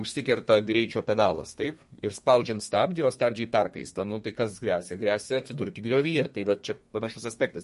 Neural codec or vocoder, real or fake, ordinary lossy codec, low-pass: codec, 32 kHz, 1.9 kbps, SNAC; fake; MP3, 48 kbps; 14.4 kHz